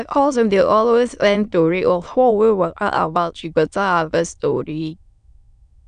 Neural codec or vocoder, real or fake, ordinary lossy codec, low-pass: autoencoder, 22.05 kHz, a latent of 192 numbers a frame, VITS, trained on many speakers; fake; none; 9.9 kHz